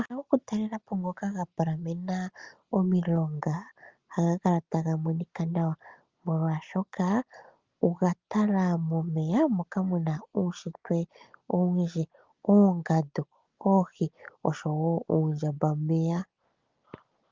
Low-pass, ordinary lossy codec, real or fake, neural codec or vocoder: 7.2 kHz; Opus, 32 kbps; real; none